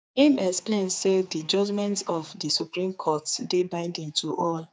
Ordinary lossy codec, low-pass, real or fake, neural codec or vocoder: none; none; fake; codec, 16 kHz, 4 kbps, X-Codec, HuBERT features, trained on general audio